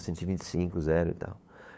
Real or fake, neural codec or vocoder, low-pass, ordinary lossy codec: fake; codec, 16 kHz, 8 kbps, FunCodec, trained on LibriTTS, 25 frames a second; none; none